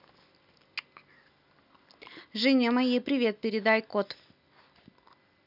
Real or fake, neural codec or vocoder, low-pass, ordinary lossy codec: real; none; 5.4 kHz; none